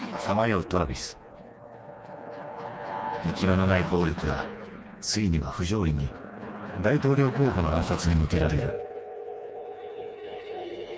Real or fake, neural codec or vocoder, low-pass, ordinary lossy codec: fake; codec, 16 kHz, 2 kbps, FreqCodec, smaller model; none; none